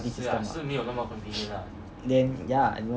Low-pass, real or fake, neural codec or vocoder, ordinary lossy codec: none; real; none; none